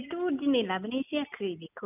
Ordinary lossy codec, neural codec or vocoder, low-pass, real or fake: none; none; 3.6 kHz; real